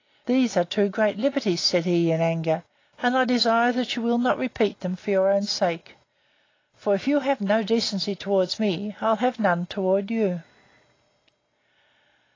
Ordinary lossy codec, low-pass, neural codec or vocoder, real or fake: AAC, 32 kbps; 7.2 kHz; none; real